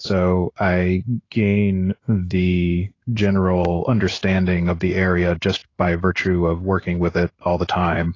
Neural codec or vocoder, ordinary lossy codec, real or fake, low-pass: codec, 16 kHz in and 24 kHz out, 1 kbps, XY-Tokenizer; AAC, 32 kbps; fake; 7.2 kHz